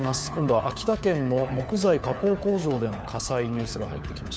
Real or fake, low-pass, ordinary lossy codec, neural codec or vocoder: fake; none; none; codec, 16 kHz, 4 kbps, FunCodec, trained on LibriTTS, 50 frames a second